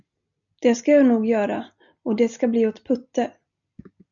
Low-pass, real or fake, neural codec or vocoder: 7.2 kHz; real; none